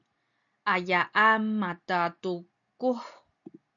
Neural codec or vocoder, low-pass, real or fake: none; 7.2 kHz; real